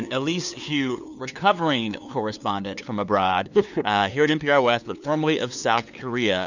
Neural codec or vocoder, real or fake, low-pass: codec, 16 kHz, 2 kbps, FunCodec, trained on LibriTTS, 25 frames a second; fake; 7.2 kHz